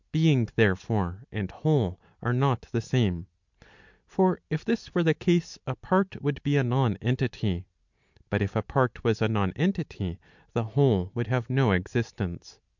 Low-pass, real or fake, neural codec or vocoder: 7.2 kHz; real; none